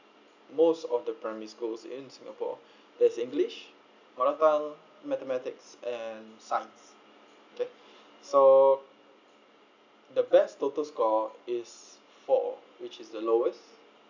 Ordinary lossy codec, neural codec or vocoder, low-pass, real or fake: none; none; 7.2 kHz; real